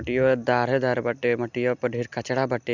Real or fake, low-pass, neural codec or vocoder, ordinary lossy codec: real; 7.2 kHz; none; Opus, 64 kbps